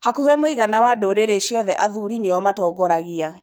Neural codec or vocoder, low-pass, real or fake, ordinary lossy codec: codec, 44.1 kHz, 2.6 kbps, SNAC; none; fake; none